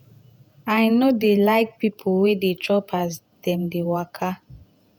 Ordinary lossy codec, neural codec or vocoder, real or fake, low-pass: none; vocoder, 48 kHz, 128 mel bands, Vocos; fake; 19.8 kHz